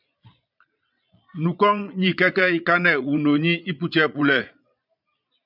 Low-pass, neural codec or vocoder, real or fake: 5.4 kHz; vocoder, 22.05 kHz, 80 mel bands, Vocos; fake